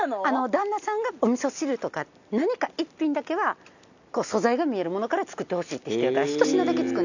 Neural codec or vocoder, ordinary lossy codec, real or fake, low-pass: none; none; real; 7.2 kHz